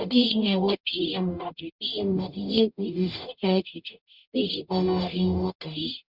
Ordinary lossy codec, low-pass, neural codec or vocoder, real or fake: Opus, 64 kbps; 5.4 kHz; codec, 44.1 kHz, 0.9 kbps, DAC; fake